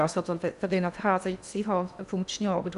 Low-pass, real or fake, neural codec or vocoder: 10.8 kHz; fake; codec, 16 kHz in and 24 kHz out, 0.8 kbps, FocalCodec, streaming, 65536 codes